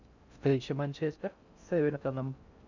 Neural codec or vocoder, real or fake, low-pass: codec, 16 kHz in and 24 kHz out, 0.6 kbps, FocalCodec, streaming, 2048 codes; fake; 7.2 kHz